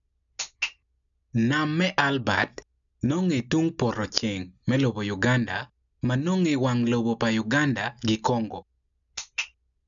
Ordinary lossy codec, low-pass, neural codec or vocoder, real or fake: none; 7.2 kHz; none; real